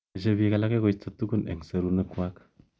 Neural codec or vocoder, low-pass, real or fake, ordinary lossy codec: none; none; real; none